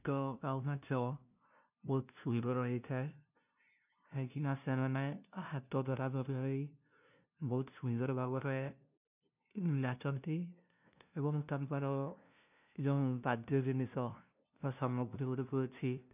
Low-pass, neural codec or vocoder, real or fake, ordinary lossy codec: 3.6 kHz; codec, 16 kHz, 0.5 kbps, FunCodec, trained on LibriTTS, 25 frames a second; fake; none